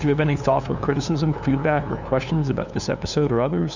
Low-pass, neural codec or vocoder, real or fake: 7.2 kHz; codec, 16 kHz, 2 kbps, FunCodec, trained on LibriTTS, 25 frames a second; fake